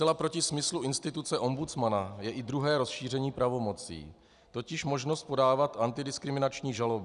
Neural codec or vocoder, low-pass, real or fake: none; 10.8 kHz; real